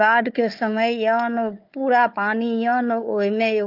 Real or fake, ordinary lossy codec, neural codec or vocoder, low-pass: fake; Opus, 24 kbps; codec, 16 kHz, 4 kbps, FunCodec, trained on Chinese and English, 50 frames a second; 5.4 kHz